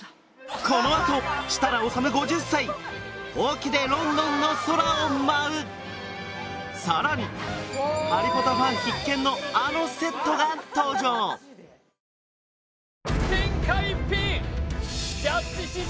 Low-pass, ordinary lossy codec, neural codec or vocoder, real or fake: none; none; none; real